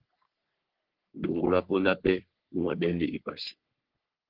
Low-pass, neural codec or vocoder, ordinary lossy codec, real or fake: 5.4 kHz; codec, 44.1 kHz, 3.4 kbps, Pupu-Codec; Opus, 16 kbps; fake